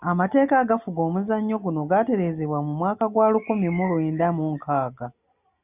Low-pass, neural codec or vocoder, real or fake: 3.6 kHz; none; real